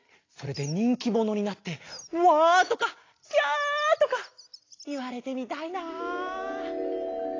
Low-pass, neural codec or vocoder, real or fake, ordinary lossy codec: 7.2 kHz; none; real; AAC, 32 kbps